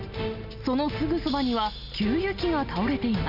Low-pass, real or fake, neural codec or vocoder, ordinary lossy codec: 5.4 kHz; real; none; none